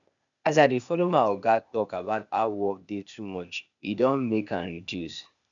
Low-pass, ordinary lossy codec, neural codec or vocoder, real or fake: 7.2 kHz; none; codec, 16 kHz, 0.8 kbps, ZipCodec; fake